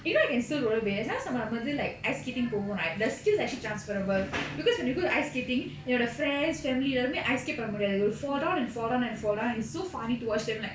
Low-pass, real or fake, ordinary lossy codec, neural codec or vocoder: none; real; none; none